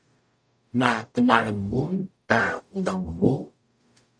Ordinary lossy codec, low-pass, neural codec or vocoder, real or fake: AAC, 64 kbps; 9.9 kHz; codec, 44.1 kHz, 0.9 kbps, DAC; fake